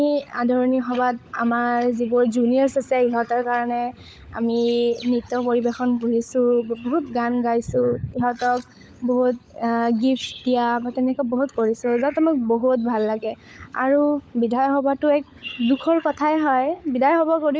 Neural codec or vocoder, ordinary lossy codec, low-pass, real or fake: codec, 16 kHz, 16 kbps, FunCodec, trained on LibriTTS, 50 frames a second; none; none; fake